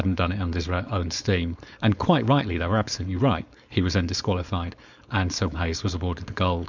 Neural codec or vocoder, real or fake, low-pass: codec, 16 kHz, 4.8 kbps, FACodec; fake; 7.2 kHz